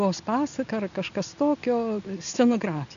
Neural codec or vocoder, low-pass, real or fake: none; 7.2 kHz; real